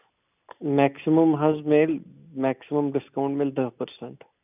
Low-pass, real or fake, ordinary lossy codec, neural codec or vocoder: 3.6 kHz; real; none; none